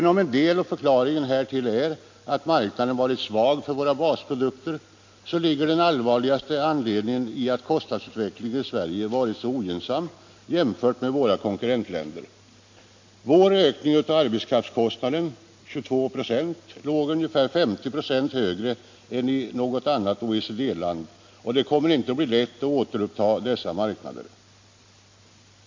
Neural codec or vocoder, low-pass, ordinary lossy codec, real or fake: none; 7.2 kHz; MP3, 48 kbps; real